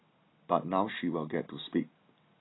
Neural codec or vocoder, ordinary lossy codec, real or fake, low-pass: none; AAC, 16 kbps; real; 7.2 kHz